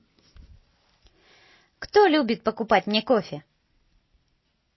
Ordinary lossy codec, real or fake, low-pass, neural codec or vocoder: MP3, 24 kbps; real; 7.2 kHz; none